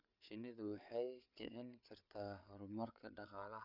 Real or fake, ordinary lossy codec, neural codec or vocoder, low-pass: fake; none; codec, 16 kHz, 8 kbps, FreqCodec, larger model; 5.4 kHz